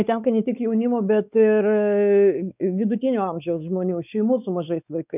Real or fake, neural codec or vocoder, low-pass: fake; codec, 16 kHz, 4 kbps, X-Codec, WavLM features, trained on Multilingual LibriSpeech; 3.6 kHz